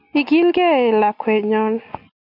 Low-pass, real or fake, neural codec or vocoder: 5.4 kHz; real; none